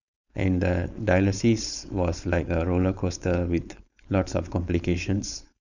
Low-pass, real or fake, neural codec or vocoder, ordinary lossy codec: 7.2 kHz; fake; codec, 16 kHz, 4.8 kbps, FACodec; none